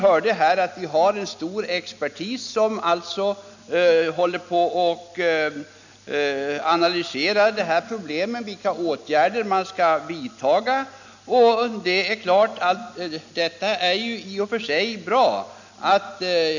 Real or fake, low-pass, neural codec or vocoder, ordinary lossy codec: real; 7.2 kHz; none; none